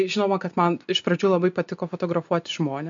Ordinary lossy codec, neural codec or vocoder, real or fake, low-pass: MP3, 48 kbps; none; real; 7.2 kHz